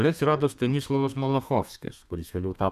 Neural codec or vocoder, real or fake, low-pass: codec, 32 kHz, 1.9 kbps, SNAC; fake; 14.4 kHz